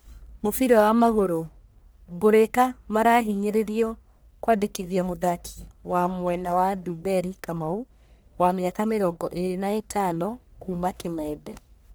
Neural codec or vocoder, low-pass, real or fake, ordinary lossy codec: codec, 44.1 kHz, 1.7 kbps, Pupu-Codec; none; fake; none